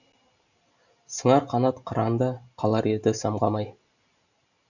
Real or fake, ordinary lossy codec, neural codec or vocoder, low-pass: real; none; none; 7.2 kHz